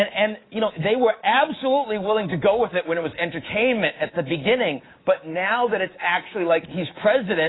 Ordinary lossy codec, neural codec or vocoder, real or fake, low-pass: AAC, 16 kbps; codec, 24 kHz, 3.1 kbps, DualCodec; fake; 7.2 kHz